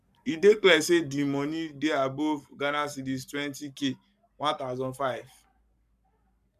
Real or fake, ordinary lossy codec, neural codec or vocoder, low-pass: fake; none; codec, 44.1 kHz, 7.8 kbps, Pupu-Codec; 14.4 kHz